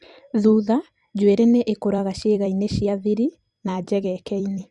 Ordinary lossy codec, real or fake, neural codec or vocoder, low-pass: Opus, 64 kbps; fake; vocoder, 44.1 kHz, 128 mel bands every 256 samples, BigVGAN v2; 10.8 kHz